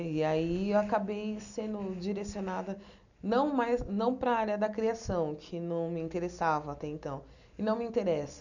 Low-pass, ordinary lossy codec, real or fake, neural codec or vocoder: 7.2 kHz; none; real; none